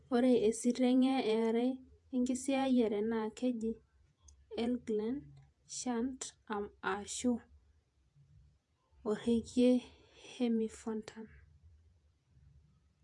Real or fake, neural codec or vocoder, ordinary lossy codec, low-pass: fake; vocoder, 48 kHz, 128 mel bands, Vocos; AAC, 64 kbps; 10.8 kHz